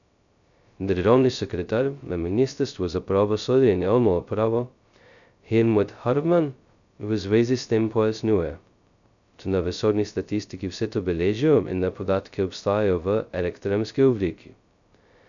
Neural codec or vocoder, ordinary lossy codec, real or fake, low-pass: codec, 16 kHz, 0.2 kbps, FocalCodec; none; fake; 7.2 kHz